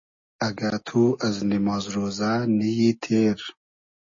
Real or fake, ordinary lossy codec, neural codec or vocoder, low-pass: real; MP3, 32 kbps; none; 7.2 kHz